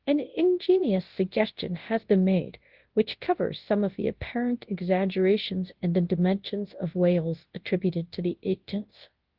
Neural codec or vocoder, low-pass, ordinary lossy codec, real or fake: codec, 24 kHz, 0.9 kbps, WavTokenizer, large speech release; 5.4 kHz; Opus, 16 kbps; fake